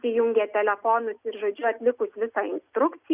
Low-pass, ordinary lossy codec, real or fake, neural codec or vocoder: 3.6 kHz; Opus, 64 kbps; real; none